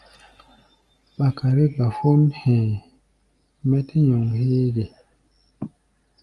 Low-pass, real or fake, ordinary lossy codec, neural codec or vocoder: 10.8 kHz; real; Opus, 32 kbps; none